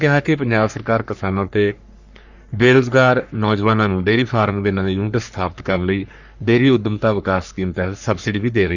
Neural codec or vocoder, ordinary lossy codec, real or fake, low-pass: codec, 44.1 kHz, 3.4 kbps, Pupu-Codec; none; fake; 7.2 kHz